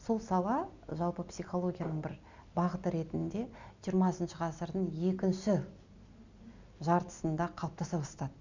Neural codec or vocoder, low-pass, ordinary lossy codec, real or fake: none; 7.2 kHz; Opus, 64 kbps; real